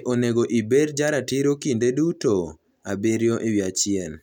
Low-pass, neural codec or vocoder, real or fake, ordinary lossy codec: 19.8 kHz; none; real; none